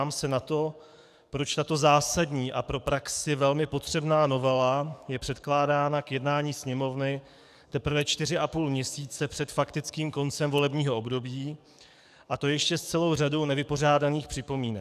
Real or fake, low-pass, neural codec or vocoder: fake; 14.4 kHz; codec, 44.1 kHz, 7.8 kbps, DAC